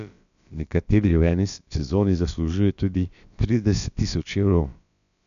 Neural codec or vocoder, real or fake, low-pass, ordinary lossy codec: codec, 16 kHz, about 1 kbps, DyCAST, with the encoder's durations; fake; 7.2 kHz; none